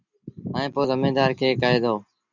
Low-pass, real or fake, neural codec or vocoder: 7.2 kHz; real; none